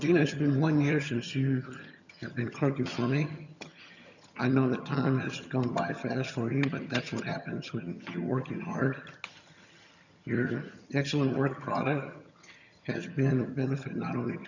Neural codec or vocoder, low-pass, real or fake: vocoder, 22.05 kHz, 80 mel bands, HiFi-GAN; 7.2 kHz; fake